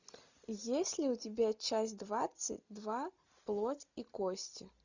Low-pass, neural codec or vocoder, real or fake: 7.2 kHz; none; real